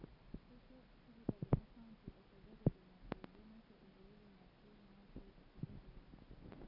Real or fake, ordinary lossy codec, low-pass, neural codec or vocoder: real; none; 5.4 kHz; none